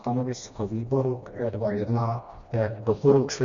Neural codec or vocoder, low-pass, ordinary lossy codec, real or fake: codec, 16 kHz, 1 kbps, FreqCodec, smaller model; 7.2 kHz; Opus, 64 kbps; fake